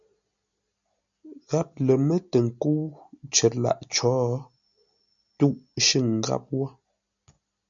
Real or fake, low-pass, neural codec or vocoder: real; 7.2 kHz; none